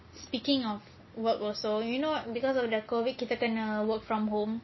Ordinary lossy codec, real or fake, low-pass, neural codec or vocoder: MP3, 24 kbps; real; 7.2 kHz; none